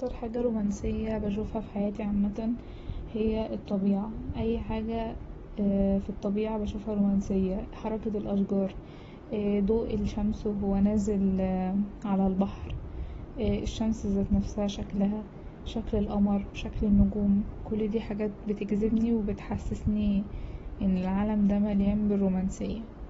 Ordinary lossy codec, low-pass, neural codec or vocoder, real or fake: AAC, 24 kbps; 19.8 kHz; none; real